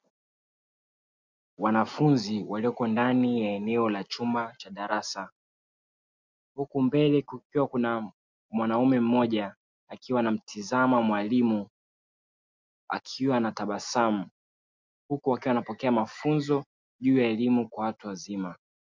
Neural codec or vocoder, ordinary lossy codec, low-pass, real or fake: none; MP3, 64 kbps; 7.2 kHz; real